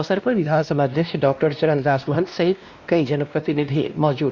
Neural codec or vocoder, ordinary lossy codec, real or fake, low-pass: codec, 16 kHz, 1 kbps, X-Codec, WavLM features, trained on Multilingual LibriSpeech; Opus, 64 kbps; fake; 7.2 kHz